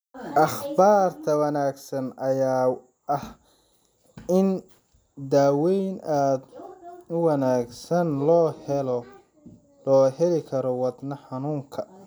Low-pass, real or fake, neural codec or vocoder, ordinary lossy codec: none; real; none; none